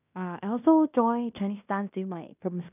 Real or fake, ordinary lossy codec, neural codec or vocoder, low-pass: fake; none; codec, 16 kHz in and 24 kHz out, 0.9 kbps, LongCat-Audio-Codec, fine tuned four codebook decoder; 3.6 kHz